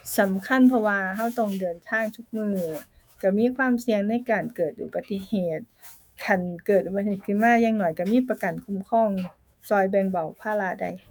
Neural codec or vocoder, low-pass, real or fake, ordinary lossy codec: autoencoder, 48 kHz, 128 numbers a frame, DAC-VAE, trained on Japanese speech; none; fake; none